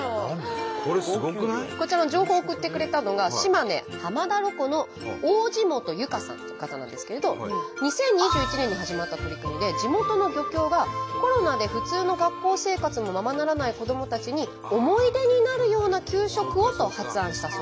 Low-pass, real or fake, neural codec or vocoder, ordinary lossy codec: none; real; none; none